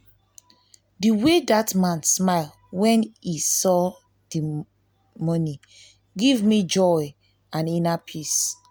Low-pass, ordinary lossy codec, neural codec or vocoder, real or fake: none; none; none; real